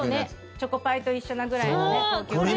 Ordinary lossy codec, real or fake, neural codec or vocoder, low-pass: none; real; none; none